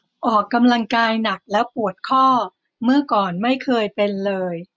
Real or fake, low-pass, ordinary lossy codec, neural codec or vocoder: real; none; none; none